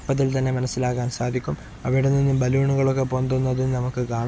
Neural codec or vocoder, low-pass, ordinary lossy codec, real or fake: none; none; none; real